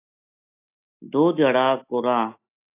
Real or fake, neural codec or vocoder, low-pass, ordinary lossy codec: real; none; 3.6 kHz; AAC, 24 kbps